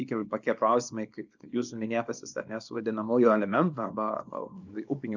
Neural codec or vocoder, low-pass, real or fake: codec, 24 kHz, 0.9 kbps, WavTokenizer, small release; 7.2 kHz; fake